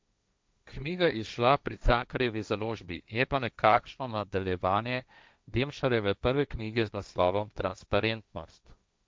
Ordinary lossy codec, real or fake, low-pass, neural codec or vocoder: none; fake; none; codec, 16 kHz, 1.1 kbps, Voila-Tokenizer